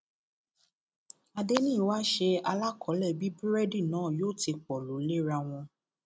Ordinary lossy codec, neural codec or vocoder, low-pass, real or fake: none; none; none; real